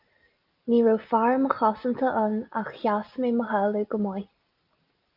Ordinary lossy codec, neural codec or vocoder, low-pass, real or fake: Opus, 24 kbps; none; 5.4 kHz; real